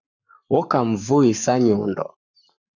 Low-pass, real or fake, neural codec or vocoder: 7.2 kHz; fake; codec, 44.1 kHz, 7.8 kbps, Pupu-Codec